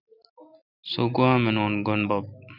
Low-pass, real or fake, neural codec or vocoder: 5.4 kHz; real; none